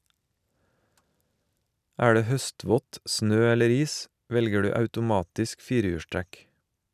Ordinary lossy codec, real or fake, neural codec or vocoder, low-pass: none; real; none; 14.4 kHz